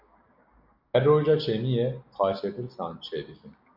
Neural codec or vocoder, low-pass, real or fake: none; 5.4 kHz; real